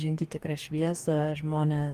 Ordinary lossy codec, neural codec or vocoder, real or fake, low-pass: Opus, 24 kbps; codec, 44.1 kHz, 2.6 kbps, DAC; fake; 14.4 kHz